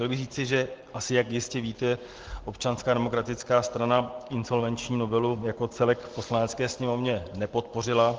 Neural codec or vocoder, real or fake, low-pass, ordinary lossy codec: none; real; 7.2 kHz; Opus, 16 kbps